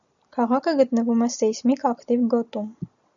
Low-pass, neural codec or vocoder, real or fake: 7.2 kHz; none; real